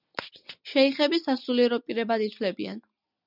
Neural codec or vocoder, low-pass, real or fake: none; 5.4 kHz; real